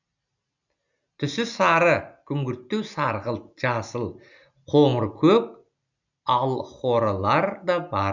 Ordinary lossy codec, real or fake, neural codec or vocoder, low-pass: none; real; none; 7.2 kHz